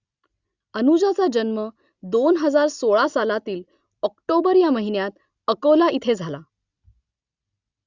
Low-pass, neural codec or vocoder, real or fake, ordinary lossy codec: 7.2 kHz; none; real; Opus, 64 kbps